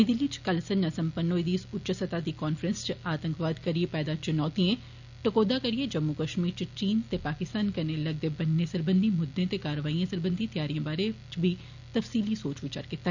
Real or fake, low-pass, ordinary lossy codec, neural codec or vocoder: real; 7.2 kHz; none; none